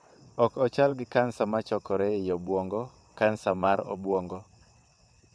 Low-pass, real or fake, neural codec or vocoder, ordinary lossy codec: none; fake; vocoder, 22.05 kHz, 80 mel bands, WaveNeXt; none